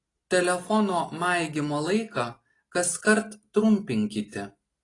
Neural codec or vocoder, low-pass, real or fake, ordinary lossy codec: none; 10.8 kHz; real; AAC, 32 kbps